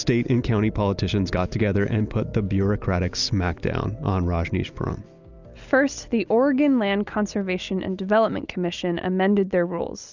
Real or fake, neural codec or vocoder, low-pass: real; none; 7.2 kHz